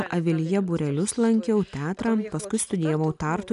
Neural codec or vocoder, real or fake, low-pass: none; real; 10.8 kHz